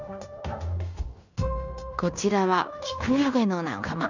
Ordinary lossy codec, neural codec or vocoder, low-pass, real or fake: none; codec, 16 kHz in and 24 kHz out, 0.9 kbps, LongCat-Audio-Codec, fine tuned four codebook decoder; 7.2 kHz; fake